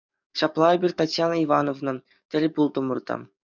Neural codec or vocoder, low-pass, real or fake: codec, 44.1 kHz, 7.8 kbps, DAC; 7.2 kHz; fake